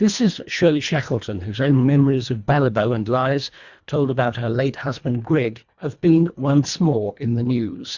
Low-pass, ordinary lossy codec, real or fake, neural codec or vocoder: 7.2 kHz; Opus, 64 kbps; fake; codec, 24 kHz, 1.5 kbps, HILCodec